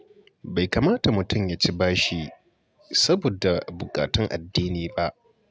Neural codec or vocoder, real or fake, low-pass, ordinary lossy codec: none; real; none; none